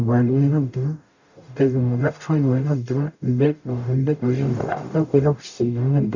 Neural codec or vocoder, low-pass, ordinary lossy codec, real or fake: codec, 44.1 kHz, 0.9 kbps, DAC; 7.2 kHz; none; fake